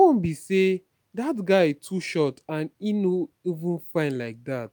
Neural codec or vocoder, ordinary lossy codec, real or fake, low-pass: none; none; real; none